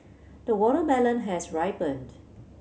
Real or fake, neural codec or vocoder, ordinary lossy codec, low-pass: real; none; none; none